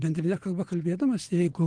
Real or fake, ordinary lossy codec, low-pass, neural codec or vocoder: fake; Opus, 24 kbps; 9.9 kHz; vocoder, 22.05 kHz, 80 mel bands, WaveNeXt